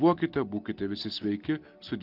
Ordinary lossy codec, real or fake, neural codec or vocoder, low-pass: Opus, 32 kbps; real; none; 5.4 kHz